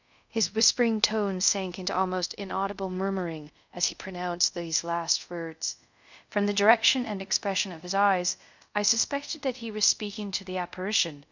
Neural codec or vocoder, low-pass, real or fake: codec, 24 kHz, 0.5 kbps, DualCodec; 7.2 kHz; fake